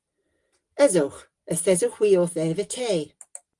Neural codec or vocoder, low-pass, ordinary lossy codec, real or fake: none; 10.8 kHz; Opus, 24 kbps; real